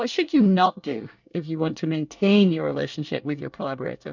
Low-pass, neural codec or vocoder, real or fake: 7.2 kHz; codec, 24 kHz, 1 kbps, SNAC; fake